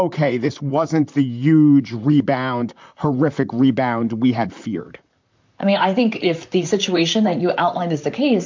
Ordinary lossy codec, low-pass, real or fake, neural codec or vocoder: MP3, 64 kbps; 7.2 kHz; fake; vocoder, 44.1 kHz, 128 mel bands, Pupu-Vocoder